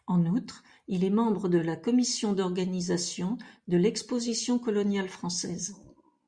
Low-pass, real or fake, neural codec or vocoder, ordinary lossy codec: 9.9 kHz; real; none; Opus, 64 kbps